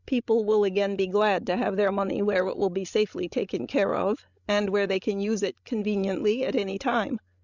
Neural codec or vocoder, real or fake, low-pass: codec, 16 kHz, 16 kbps, FreqCodec, larger model; fake; 7.2 kHz